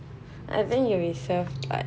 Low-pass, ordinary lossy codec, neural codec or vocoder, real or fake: none; none; none; real